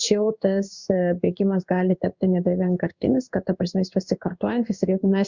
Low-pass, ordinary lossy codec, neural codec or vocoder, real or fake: 7.2 kHz; Opus, 64 kbps; codec, 16 kHz in and 24 kHz out, 1 kbps, XY-Tokenizer; fake